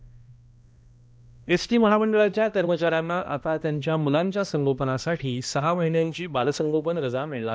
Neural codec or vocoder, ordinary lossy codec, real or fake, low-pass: codec, 16 kHz, 1 kbps, X-Codec, HuBERT features, trained on balanced general audio; none; fake; none